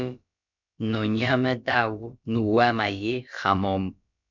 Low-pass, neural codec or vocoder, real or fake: 7.2 kHz; codec, 16 kHz, about 1 kbps, DyCAST, with the encoder's durations; fake